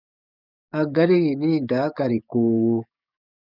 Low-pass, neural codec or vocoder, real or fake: 5.4 kHz; codec, 44.1 kHz, 7.8 kbps, DAC; fake